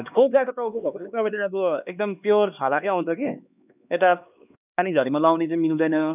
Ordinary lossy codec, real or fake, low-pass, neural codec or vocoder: none; fake; 3.6 kHz; codec, 16 kHz, 2 kbps, X-Codec, HuBERT features, trained on LibriSpeech